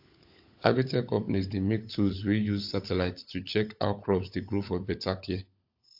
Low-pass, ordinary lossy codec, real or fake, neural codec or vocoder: 5.4 kHz; AAC, 48 kbps; fake; codec, 16 kHz, 16 kbps, FunCodec, trained on LibriTTS, 50 frames a second